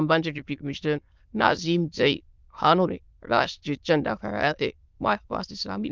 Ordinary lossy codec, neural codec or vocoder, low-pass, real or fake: Opus, 32 kbps; autoencoder, 22.05 kHz, a latent of 192 numbers a frame, VITS, trained on many speakers; 7.2 kHz; fake